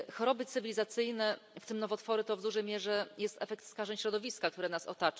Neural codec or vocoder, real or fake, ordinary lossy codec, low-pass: none; real; none; none